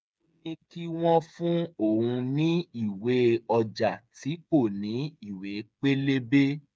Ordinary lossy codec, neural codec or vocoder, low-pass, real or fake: none; codec, 16 kHz, 8 kbps, FreqCodec, smaller model; none; fake